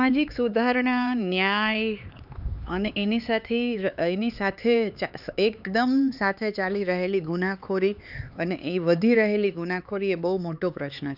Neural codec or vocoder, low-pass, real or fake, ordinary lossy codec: codec, 16 kHz, 4 kbps, X-Codec, HuBERT features, trained on LibriSpeech; 5.4 kHz; fake; none